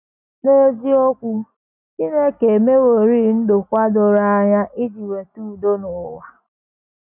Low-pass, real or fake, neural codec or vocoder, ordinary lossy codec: 3.6 kHz; real; none; none